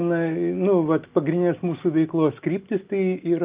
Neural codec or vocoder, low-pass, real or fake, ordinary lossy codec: none; 3.6 kHz; real; Opus, 32 kbps